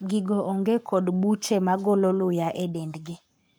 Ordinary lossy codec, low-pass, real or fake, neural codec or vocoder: none; none; fake; codec, 44.1 kHz, 7.8 kbps, Pupu-Codec